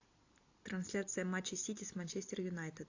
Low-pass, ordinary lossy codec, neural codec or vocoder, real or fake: 7.2 kHz; AAC, 48 kbps; none; real